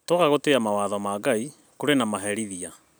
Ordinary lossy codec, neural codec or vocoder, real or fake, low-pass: none; none; real; none